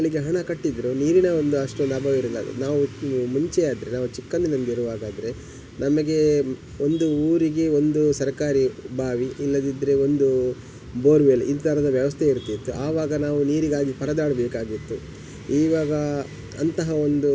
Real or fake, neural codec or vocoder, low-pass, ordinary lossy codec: real; none; none; none